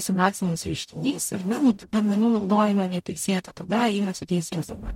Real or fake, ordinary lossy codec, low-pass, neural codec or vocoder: fake; MP3, 64 kbps; 19.8 kHz; codec, 44.1 kHz, 0.9 kbps, DAC